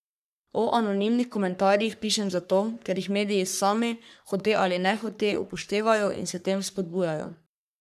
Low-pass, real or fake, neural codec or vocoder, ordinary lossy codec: 14.4 kHz; fake; codec, 44.1 kHz, 3.4 kbps, Pupu-Codec; none